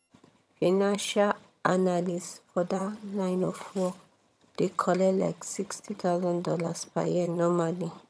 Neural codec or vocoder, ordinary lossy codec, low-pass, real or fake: vocoder, 22.05 kHz, 80 mel bands, HiFi-GAN; none; none; fake